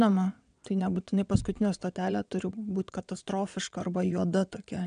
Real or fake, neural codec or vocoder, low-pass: fake; vocoder, 22.05 kHz, 80 mel bands, WaveNeXt; 9.9 kHz